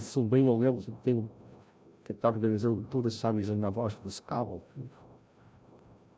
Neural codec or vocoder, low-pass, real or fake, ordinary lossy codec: codec, 16 kHz, 0.5 kbps, FreqCodec, larger model; none; fake; none